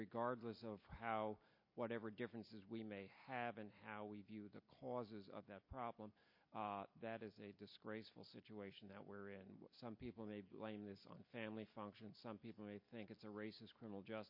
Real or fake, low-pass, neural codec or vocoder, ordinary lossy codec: real; 5.4 kHz; none; MP3, 32 kbps